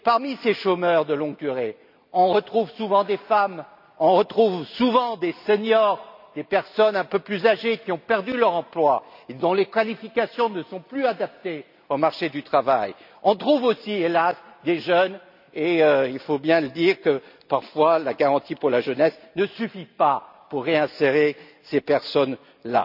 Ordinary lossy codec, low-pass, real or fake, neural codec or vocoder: none; 5.4 kHz; real; none